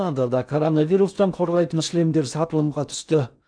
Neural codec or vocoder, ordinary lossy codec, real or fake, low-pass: codec, 16 kHz in and 24 kHz out, 0.6 kbps, FocalCodec, streaming, 4096 codes; MP3, 96 kbps; fake; 9.9 kHz